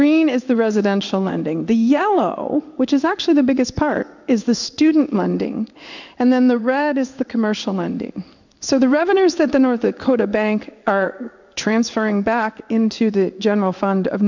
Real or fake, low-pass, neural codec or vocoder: fake; 7.2 kHz; codec, 16 kHz in and 24 kHz out, 1 kbps, XY-Tokenizer